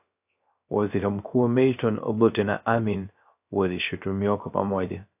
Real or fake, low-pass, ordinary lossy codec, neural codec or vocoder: fake; 3.6 kHz; AAC, 32 kbps; codec, 16 kHz, 0.3 kbps, FocalCodec